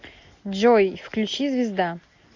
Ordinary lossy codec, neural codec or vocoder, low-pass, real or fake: AAC, 48 kbps; none; 7.2 kHz; real